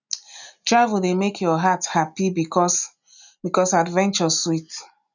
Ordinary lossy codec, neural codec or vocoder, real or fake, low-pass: none; none; real; 7.2 kHz